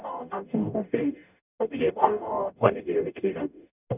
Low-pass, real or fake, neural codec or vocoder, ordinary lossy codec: 3.6 kHz; fake; codec, 44.1 kHz, 0.9 kbps, DAC; none